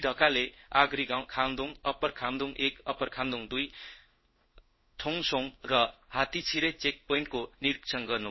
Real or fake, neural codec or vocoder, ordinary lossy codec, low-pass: fake; codec, 16 kHz in and 24 kHz out, 1 kbps, XY-Tokenizer; MP3, 24 kbps; 7.2 kHz